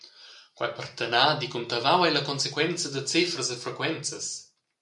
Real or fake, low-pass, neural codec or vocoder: real; 10.8 kHz; none